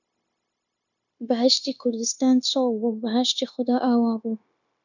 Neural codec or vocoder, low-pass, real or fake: codec, 16 kHz, 0.9 kbps, LongCat-Audio-Codec; 7.2 kHz; fake